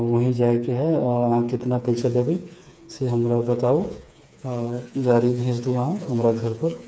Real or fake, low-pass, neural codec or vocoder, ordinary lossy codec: fake; none; codec, 16 kHz, 4 kbps, FreqCodec, smaller model; none